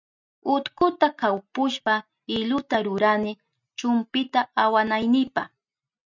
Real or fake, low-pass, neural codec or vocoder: real; 7.2 kHz; none